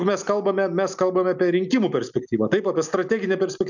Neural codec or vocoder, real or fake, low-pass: none; real; 7.2 kHz